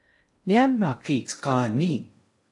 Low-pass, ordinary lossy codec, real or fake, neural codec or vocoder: 10.8 kHz; AAC, 64 kbps; fake; codec, 16 kHz in and 24 kHz out, 0.6 kbps, FocalCodec, streaming, 2048 codes